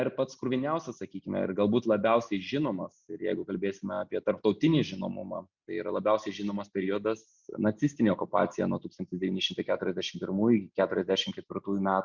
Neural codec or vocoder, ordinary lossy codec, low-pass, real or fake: none; Opus, 64 kbps; 7.2 kHz; real